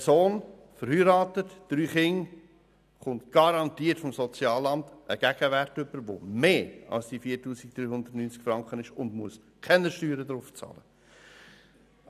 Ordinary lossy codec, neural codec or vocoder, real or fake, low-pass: none; none; real; 14.4 kHz